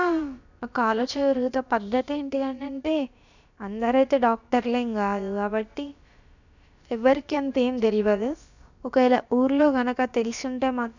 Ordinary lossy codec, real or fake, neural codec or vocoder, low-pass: none; fake; codec, 16 kHz, about 1 kbps, DyCAST, with the encoder's durations; 7.2 kHz